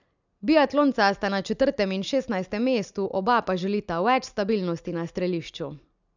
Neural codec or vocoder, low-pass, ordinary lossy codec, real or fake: none; 7.2 kHz; none; real